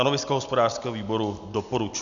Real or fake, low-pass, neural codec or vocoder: real; 7.2 kHz; none